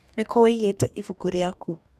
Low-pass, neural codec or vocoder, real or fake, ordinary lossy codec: 14.4 kHz; codec, 44.1 kHz, 2.6 kbps, DAC; fake; none